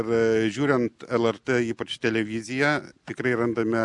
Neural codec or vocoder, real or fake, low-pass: none; real; 10.8 kHz